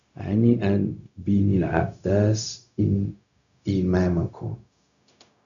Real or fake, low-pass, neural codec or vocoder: fake; 7.2 kHz; codec, 16 kHz, 0.4 kbps, LongCat-Audio-Codec